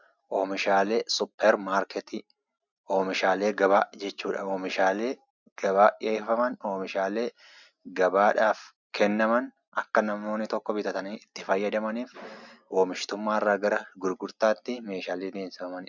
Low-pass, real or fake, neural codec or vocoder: 7.2 kHz; real; none